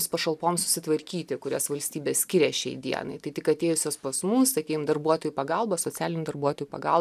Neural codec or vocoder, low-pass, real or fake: none; 14.4 kHz; real